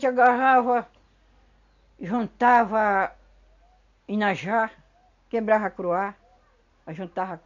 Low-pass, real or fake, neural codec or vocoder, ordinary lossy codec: 7.2 kHz; real; none; none